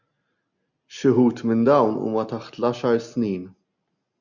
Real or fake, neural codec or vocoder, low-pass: real; none; 7.2 kHz